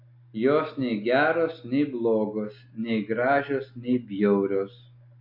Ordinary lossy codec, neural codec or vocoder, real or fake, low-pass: AAC, 48 kbps; none; real; 5.4 kHz